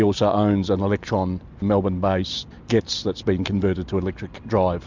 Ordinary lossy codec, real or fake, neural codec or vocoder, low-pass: MP3, 64 kbps; real; none; 7.2 kHz